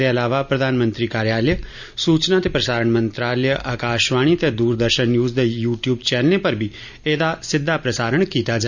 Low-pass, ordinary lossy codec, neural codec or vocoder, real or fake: 7.2 kHz; none; none; real